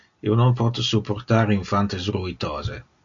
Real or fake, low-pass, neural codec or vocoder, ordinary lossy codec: real; 7.2 kHz; none; AAC, 64 kbps